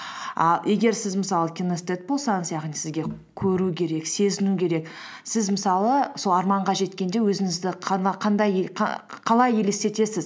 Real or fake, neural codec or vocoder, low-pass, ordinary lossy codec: real; none; none; none